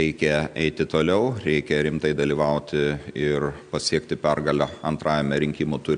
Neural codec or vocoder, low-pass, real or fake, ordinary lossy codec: none; 9.9 kHz; real; MP3, 96 kbps